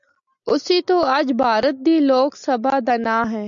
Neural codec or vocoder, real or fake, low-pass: none; real; 7.2 kHz